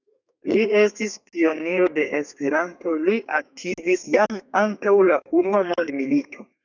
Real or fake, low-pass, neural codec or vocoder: fake; 7.2 kHz; codec, 32 kHz, 1.9 kbps, SNAC